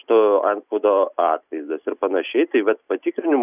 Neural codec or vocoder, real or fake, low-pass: none; real; 3.6 kHz